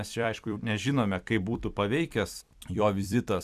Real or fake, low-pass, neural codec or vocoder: fake; 14.4 kHz; vocoder, 44.1 kHz, 128 mel bands every 256 samples, BigVGAN v2